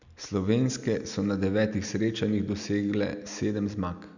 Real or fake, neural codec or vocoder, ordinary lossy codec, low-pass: real; none; none; 7.2 kHz